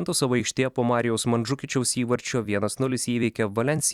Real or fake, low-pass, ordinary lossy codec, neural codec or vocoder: fake; 19.8 kHz; Opus, 64 kbps; vocoder, 44.1 kHz, 128 mel bands every 256 samples, BigVGAN v2